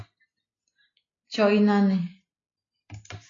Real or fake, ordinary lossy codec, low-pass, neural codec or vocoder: real; AAC, 32 kbps; 7.2 kHz; none